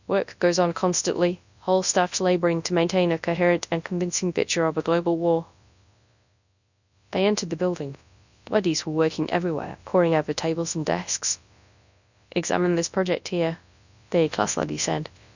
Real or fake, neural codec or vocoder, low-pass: fake; codec, 24 kHz, 0.9 kbps, WavTokenizer, large speech release; 7.2 kHz